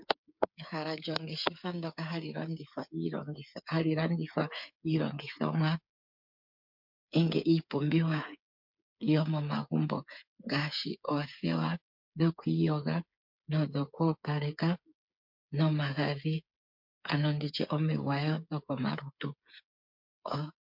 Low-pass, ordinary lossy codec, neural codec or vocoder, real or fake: 5.4 kHz; MP3, 48 kbps; codec, 16 kHz, 8 kbps, FreqCodec, smaller model; fake